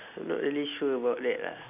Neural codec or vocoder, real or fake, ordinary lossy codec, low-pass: none; real; none; 3.6 kHz